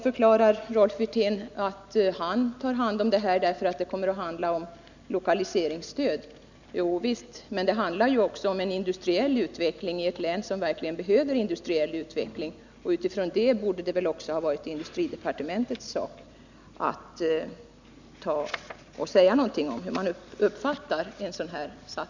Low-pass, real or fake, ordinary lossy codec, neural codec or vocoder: 7.2 kHz; real; none; none